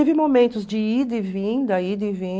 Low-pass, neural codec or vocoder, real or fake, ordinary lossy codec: none; none; real; none